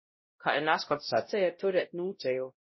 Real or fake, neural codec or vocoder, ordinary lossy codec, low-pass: fake; codec, 16 kHz, 0.5 kbps, X-Codec, WavLM features, trained on Multilingual LibriSpeech; MP3, 24 kbps; 7.2 kHz